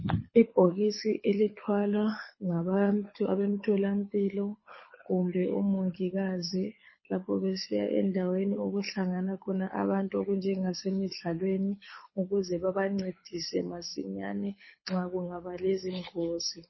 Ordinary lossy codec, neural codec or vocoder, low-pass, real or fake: MP3, 24 kbps; codec, 24 kHz, 6 kbps, HILCodec; 7.2 kHz; fake